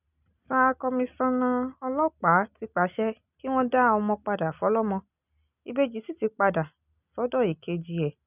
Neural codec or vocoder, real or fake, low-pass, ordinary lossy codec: none; real; 3.6 kHz; none